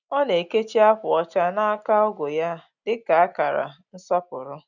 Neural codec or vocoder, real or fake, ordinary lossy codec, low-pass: none; real; none; 7.2 kHz